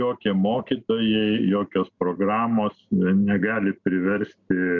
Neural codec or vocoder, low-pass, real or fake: none; 7.2 kHz; real